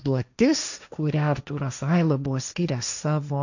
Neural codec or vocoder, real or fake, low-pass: codec, 16 kHz, 1.1 kbps, Voila-Tokenizer; fake; 7.2 kHz